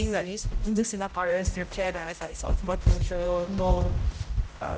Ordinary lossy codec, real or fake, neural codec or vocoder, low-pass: none; fake; codec, 16 kHz, 0.5 kbps, X-Codec, HuBERT features, trained on general audio; none